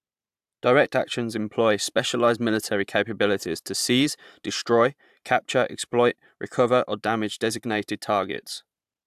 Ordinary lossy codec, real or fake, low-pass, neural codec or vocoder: AAC, 96 kbps; fake; 14.4 kHz; vocoder, 44.1 kHz, 128 mel bands every 512 samples, BigVGAN v2